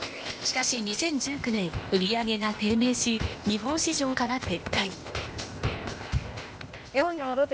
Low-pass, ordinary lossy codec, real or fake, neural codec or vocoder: none; none; fake; codec, 16 kHz, 0.8 kbps, ZipCodec